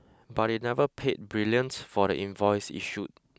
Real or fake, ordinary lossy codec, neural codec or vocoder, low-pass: real; none; none; none